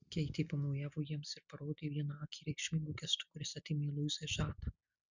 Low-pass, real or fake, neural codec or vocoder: 7.2 kHz; real; none